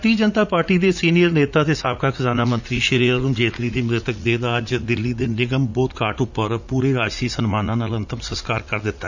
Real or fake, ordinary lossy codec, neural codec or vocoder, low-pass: fake; none; vocoder, 44.1 kHz, 80 mel bands, Vocos; 7.2 kHz